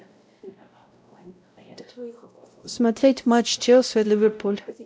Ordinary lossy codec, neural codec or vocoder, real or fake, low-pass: none; codec, 16 kHz, 0.5 kbps, X-Codec, WavLM features, trained on Multilingual LibriSpeech; fake; none